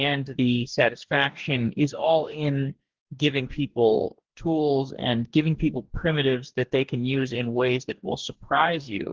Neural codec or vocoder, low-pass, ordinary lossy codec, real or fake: codec, 44.1 kHz, 2.6 kbps, DAC; 7.2 kHz; Opus, 16 kbps; fake